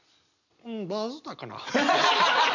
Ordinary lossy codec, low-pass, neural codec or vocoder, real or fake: none; 7.2 kHz; none; real